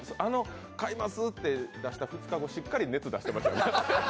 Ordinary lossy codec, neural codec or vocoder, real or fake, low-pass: none; none; real; none